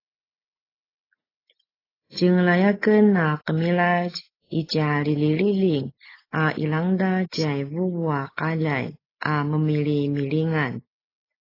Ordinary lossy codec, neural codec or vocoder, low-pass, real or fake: AAC, 24 kbps; none; 5.4 kHz; real